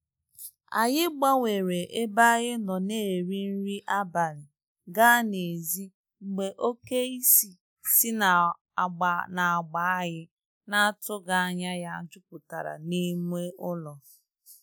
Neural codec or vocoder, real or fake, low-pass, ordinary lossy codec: none; real; none; none